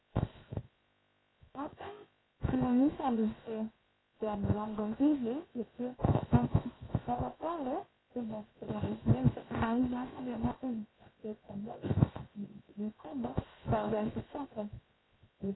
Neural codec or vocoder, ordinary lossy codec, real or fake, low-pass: codec, 16 kHz, 0.7 kbps, FocalCodec; AAC, 16 kbps; fake; 7.2 kHz